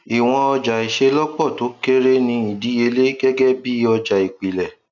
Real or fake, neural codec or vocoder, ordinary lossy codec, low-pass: real; none; none; 7.2 kHz